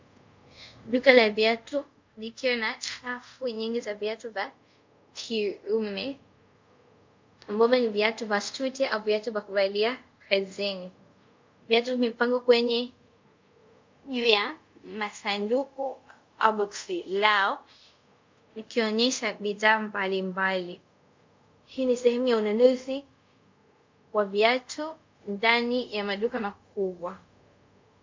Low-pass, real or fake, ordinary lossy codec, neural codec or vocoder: 7.2 kHz; fake; MP3, 64 kbps; codec, 24 kHz, 0.5 kbps, DualCodec